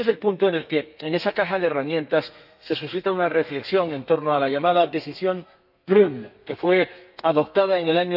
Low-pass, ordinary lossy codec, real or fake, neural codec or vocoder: 5.4 kHz; none; fake; codec, 32 kHz, 1.9 kbps, SNAC